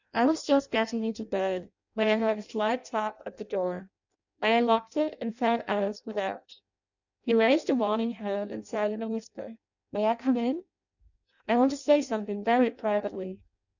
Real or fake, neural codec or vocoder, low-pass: fake; codec, 16 kHz in and 24 kHz out, 0.6 kbps, FireRedTTS-2 codec; 7.2 kHz